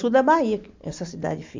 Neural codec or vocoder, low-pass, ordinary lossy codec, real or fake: none; 7.2 kHz; MP3, 48 kbps; real